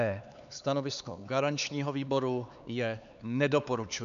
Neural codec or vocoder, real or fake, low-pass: codec, 16 kHz, 4 kbps, X-Codec, HuBERT features, trained on LibriSpeech; fake; 7.2 kHz